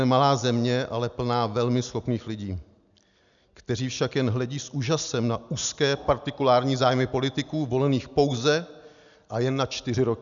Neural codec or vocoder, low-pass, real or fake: none; 7.2 kHz; real